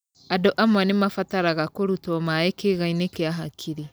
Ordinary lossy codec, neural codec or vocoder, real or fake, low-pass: none; none; real; none